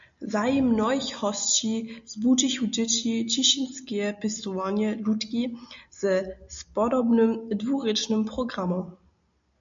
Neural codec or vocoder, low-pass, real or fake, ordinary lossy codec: none; 7.2 kHz; real; MP3, 64 kbps